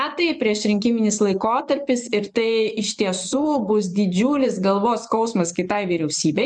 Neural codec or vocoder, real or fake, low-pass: vocoder, 24 kHz, 100 mel bands, Vocos; fake; 10.8 kHz